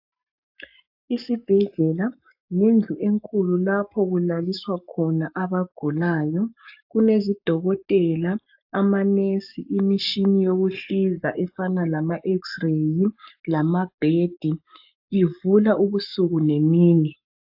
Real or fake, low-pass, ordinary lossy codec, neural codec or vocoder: fake; 5.4 kHz; AAC, 48 kbps; codec, 44.1 kHz, 7.8 kbps, Pupu-Codec